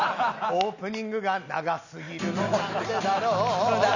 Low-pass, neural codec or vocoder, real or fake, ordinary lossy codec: 7.2 kHz; none; real; none